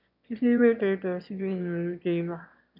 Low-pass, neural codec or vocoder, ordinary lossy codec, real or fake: 5.4 kHz; autoencoder, 22.05 kHz, a latent of 192 numbers a frame, VITS, trained on one speaker; none; fake